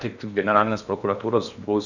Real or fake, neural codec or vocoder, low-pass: fake; codec, 16 kHz in and 24 kHz out, 0.8 kbps, FocalCodec, streaming, 65536 codes; 7.2 kHz